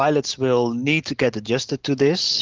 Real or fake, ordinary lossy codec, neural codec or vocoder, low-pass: real; Opus, 16 kbps; none; 7.2 kHz